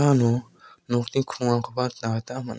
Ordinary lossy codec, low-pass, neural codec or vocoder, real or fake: none; none; none; real